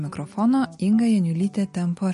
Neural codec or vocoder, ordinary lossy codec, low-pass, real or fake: none; MP3, 48 kbps; 14.4 kHz; real